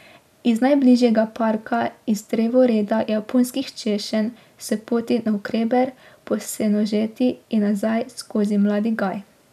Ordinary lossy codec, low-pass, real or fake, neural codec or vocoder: none; 14.4 kHz; real; none